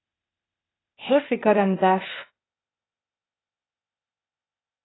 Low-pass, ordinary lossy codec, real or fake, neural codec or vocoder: 7.2 kHz; AAC, 16 kbps; fake; codec, 16 kHz, 0.8 kbps, ZipCodec